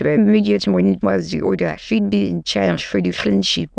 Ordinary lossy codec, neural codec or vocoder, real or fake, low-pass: none; autoencoder, 22.05 kHz, a latent of 192 numbers a frame, VITS, trained on many speakers; fake; 9.9 kHz